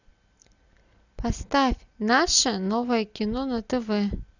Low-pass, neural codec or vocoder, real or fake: 7.2 kHz; none; real